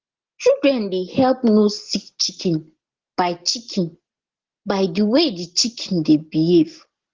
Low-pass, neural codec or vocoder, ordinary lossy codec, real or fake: 7.2 kHz; none; Opus, 16 kbps; real